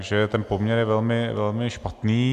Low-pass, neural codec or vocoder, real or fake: 14.4 kHz; none; real